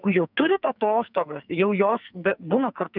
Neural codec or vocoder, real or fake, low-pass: codec, 44.1 kHz, 2.6 kbps, SNAC; fake; 5.4 kHz